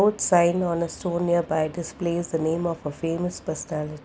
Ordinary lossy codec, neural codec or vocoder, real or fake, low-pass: none; none; real; none